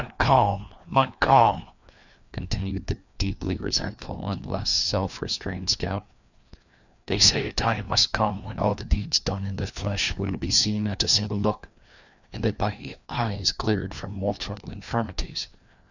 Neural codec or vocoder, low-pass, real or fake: codec, 16 kHz, 2 kbps, FreqCodec, larger model; 7.2 kHz; fake